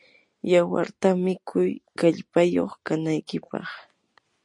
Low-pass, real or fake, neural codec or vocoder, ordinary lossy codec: 10.8 kHz; real; none; MP3, 48 kbps